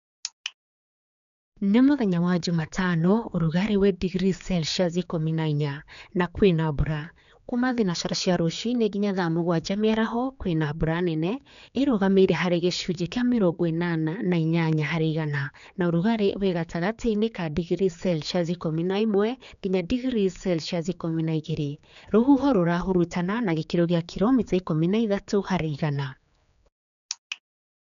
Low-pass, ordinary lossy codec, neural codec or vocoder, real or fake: 7.2 kHz; none; codec, 16 kHz, 4 kbps, X-Codec, HuBERT features, trained on general audio; fake